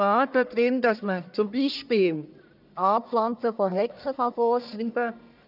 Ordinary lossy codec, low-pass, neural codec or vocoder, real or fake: none; 5.4 kHz; codec, 44.1 kHz, 1.7 kbps, Pupu-Codec; fake